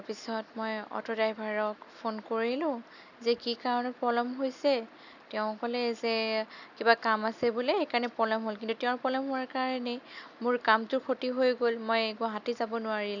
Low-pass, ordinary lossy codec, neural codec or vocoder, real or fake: 7.2 kHz; none; none; real